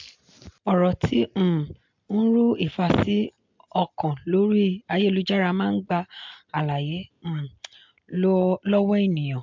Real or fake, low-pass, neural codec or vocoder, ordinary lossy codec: real; 7.2 kHz; none; MP3, 64 kbps